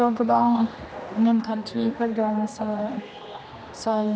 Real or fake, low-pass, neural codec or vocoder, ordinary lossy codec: fake; none; codec, 16 kHz, 1 kbps, X-Codec, HuBERT features, trained on general audio; none